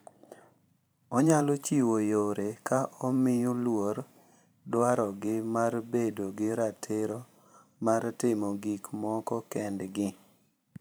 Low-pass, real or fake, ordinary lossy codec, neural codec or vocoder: none; fake; none; vocoder, 44.1 kHz, 128 mel bands every 512 samples, BigVGAN v2